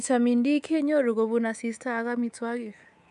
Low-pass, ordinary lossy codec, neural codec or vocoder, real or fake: 10.8 kHz; none; codec, 24 kHz, 3.1 kbps, DualCodec; fake